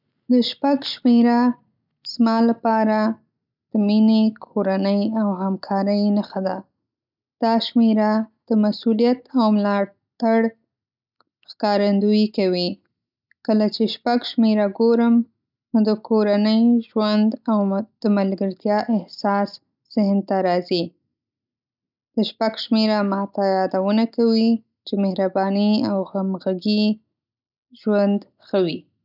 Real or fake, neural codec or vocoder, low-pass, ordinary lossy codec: real; none; 5.4 kHz; none